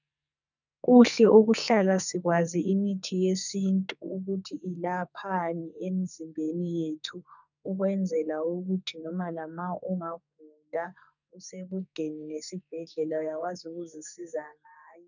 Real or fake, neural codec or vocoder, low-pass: fake; codec, 44.1 kHz, 2.6 kbps, SNAC; 7.2 kHz